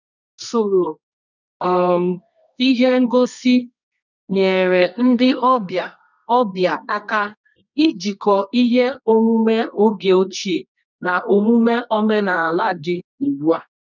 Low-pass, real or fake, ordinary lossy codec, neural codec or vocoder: 7.2 kHz; fake; none; codec, 24 kHz, 0.9 kbps, WavTokenizer, medium music audio release